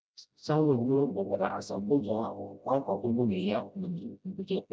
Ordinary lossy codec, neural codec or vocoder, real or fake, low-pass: none; codec, 16 kHz, 0.5 kbps, FreqCodec, smaller model; fake; none